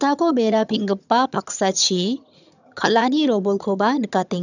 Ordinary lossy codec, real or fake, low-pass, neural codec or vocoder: none; fake; 7.2 kHz; vocoder, 22.05 kHz, 80 mel bands, HiFi-GAN